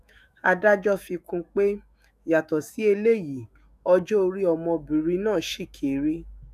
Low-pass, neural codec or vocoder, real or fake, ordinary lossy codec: 14.4 kHz; autoencoder, 48 kHz, 128 numbers a frame, DAC-VAE, trained on Japanese speech; fake; none